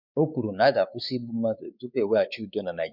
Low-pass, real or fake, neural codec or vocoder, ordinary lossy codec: 5.4 kHz; fake; codec, 16 kHz, 4 kbps, X-Codec, WavLM features, trained on Multilingual LibriSpeech; none